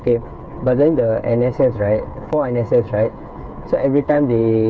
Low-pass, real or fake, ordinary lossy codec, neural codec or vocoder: none; fake; none; codec, 16 kHz, 8 kbps, FreqCodec, smaller model